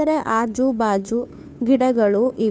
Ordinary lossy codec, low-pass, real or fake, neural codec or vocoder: none; none; fake; codec, 16 kHz, 2 kbps, FunCodec, trained on Chinese and English, 25 frames a second